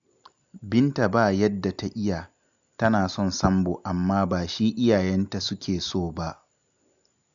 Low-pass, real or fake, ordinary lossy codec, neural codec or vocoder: 7.2 kHz; real; none; none